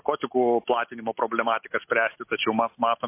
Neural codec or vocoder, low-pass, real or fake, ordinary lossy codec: none; 3.6 kHz; real; MP3, 24 kbps